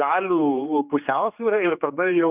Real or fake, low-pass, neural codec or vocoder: fake; 3.6 kHz; codec, 16 kHz, 2 kbps, X-Codec, HuBERT features, trained on general audio